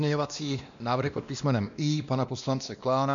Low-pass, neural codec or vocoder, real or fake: 7.2 kHz; codec, 16 kHz, 1 kbps, X-Codec, WavLM features, trained on Multilingual LibriSpeech; fake